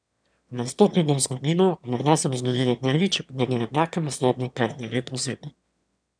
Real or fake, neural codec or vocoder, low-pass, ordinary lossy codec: fake; autoencoder, 22.05 kHz, a latent of 192 numbers a frame, VITS, trained on one speaker; 9.9 kHz; none